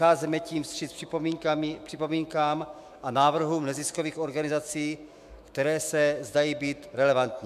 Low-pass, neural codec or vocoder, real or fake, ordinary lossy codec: 14.4 kHz; autoencoder, 48 kHz, 128 numbers a frame, DAC-VAE, trained on Japanese speech; fake; MP3, 96 kbps